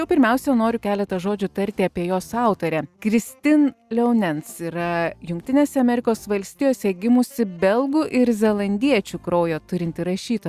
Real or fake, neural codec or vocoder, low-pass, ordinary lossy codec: real; none; 14.4 kHz; Opus, 64 kbps